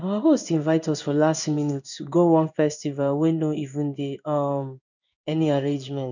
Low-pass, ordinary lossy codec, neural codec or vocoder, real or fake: 7.2 kHz; none; codec, 16 kHz in and 24 kHz out, 1 kbps, XY-Tokenizer; fake